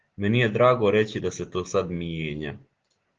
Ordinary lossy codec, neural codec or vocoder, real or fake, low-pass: Opus, 16 kbps; none; real; 7.2 kHz